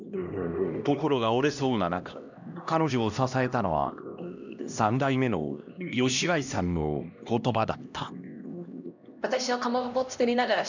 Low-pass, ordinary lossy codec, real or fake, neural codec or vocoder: 7.2 kHz; none; fake; codec, 16 kHz, 1 kbps, X-Codec, HuBERT features, trained on LibriSpeech